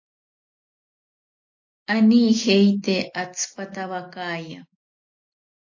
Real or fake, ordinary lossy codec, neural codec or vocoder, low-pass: real; AAC, 48 kbps; none; 7.2 kHz